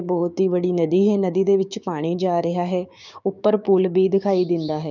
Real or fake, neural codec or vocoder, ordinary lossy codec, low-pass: fake; codec, 16 kHz, 6 kbps, DAC; none; 7.2 kHz